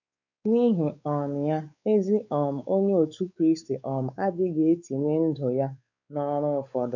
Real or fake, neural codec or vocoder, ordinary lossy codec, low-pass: fake; codec, 16 kHz, 4 kbps, X-Codec, WavLM features, trained on Multilingual LibriSpeech; none; 7.2 kHz